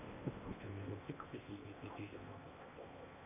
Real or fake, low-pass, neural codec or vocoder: fake; 3.6 kHz; codec, 16 kHz in and 24 kHz out, 0.8 kbps, FocalCodec, streaming, 65536 codes